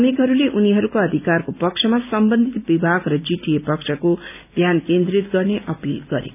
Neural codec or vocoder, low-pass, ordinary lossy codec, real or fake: none; 3.6 kHz; none; real